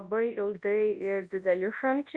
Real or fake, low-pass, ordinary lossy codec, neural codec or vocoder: fake; 9.9 kHz; Opus, 64 kbps; codec, 24 kHz, 0.9 kbps, WavTokenizer, large speech release